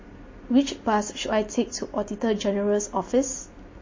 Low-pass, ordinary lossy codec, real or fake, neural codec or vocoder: 7.2 kHz; MP3, 32 kbps; real; none